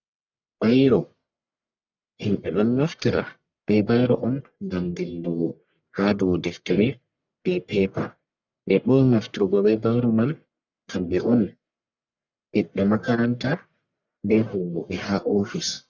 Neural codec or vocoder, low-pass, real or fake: codec, 44.1 kHz, 1.7 kbps, Pupu-Codec; 7.2 kHz; fake